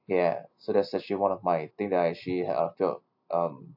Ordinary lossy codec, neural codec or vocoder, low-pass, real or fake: AAC, 48 kbps; none; 5.4 kHz; real